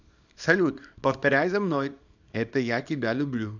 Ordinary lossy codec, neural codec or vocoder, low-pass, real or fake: none; codec, 24 kHz, 0.9 kbps, WavTokenizer, small release; 7.2 kHz; fake